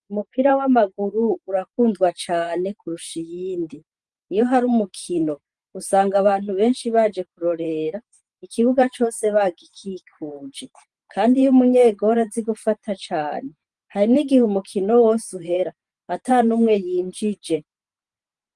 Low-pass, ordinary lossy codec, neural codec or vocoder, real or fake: 10.8 kHz; Opus, 24 kbps; vocoder, 44.1 kHz, 128 mel bands every 512 samples, BigVGAN v2; fake